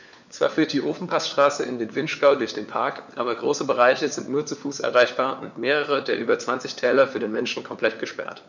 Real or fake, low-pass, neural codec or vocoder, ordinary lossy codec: fake; 7.2 kHz; codec, 16 kHz, 4 kbps, FunCodec, trained on LibriTTS, 50 frames a second; none